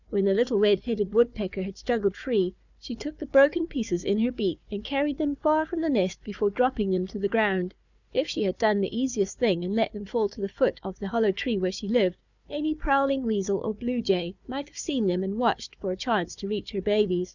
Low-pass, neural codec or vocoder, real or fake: 7.2 kHz; codec, 16 kHz, 4 kbps, FunCodec, trained on Chinese and English, 50 frames a second; fake